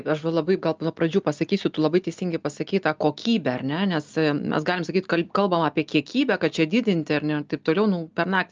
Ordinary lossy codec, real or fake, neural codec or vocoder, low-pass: Opus, 24 kbps; real; none; 7.2 kHz